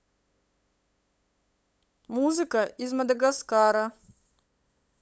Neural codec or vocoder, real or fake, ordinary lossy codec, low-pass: codec, 16 kHz, 8 kbps, FunCodec, trained on LibriTTS, 25 frames a second; fake; none; none